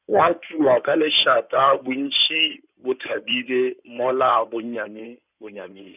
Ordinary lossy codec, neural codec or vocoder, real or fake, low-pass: none; codec, 16 kHz in and 24 kHz out, 2.2 kbps, FireRedTTS-2 codec; fake; 3.6 kHz